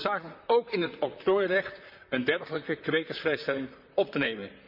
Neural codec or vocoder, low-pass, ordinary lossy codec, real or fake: vocoder, 44.1 kHz, 128 mel bands, Pupu-Vocoder; 5.4 kHz; none; fake